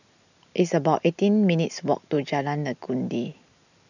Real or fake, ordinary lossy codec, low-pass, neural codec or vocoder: real; none; 7.2 kHz; none